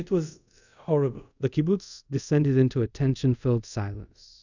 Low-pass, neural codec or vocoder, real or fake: 7.2 kHz; codec, 24 kHz, 0.5 kbps, DualCodec; fake